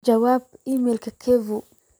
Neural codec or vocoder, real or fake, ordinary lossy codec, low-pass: vocoder, 44.1 kHz, 128 mel bands every 256 samples, BigVGAN v2; fake; none; none